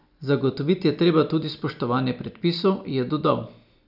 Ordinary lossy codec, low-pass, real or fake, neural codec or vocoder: none; 5.4 kHz; real; none